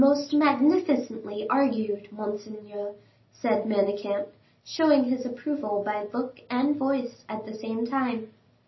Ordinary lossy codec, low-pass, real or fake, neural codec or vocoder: MP3, 24 kbps; 7.2 kHz; real; none